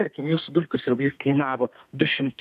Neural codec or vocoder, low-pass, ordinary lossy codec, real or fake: codec, 32 kHz, 1.9 kbps, SNAC; 14.4 kHz; AAC, 96 kbps; fake